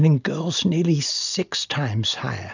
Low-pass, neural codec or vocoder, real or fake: 7.2 kHz; none; real